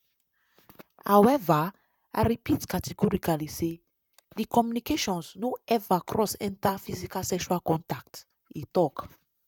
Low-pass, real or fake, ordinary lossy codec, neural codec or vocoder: 19.8 kHz; fake; none; vocoder, 44.1 kHz, 128 mel bands, Pupu-Vocoder